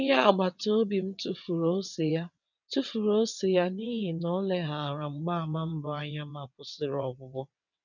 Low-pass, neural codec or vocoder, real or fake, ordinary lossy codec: 7.2 kHz; vocoder, 22.05 kHz, 80 mel bands, WaveNeXt; fake; none